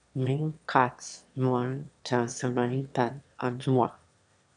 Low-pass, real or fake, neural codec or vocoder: 9.9 kHz; fake; autoencoder, 22.05 kHz, a latent of 192 numbers a frame, VITS, trained on one speaker